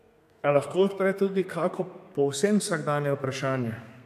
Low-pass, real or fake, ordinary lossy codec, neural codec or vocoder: 14.4 kHz; fake; none; codec, 32 kHz, 1.9 kbps, SNAC